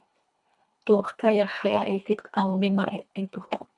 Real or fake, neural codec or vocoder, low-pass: fake; codec, 24 kHz, 1.5 kbps, HILCodec; 10.8 kHz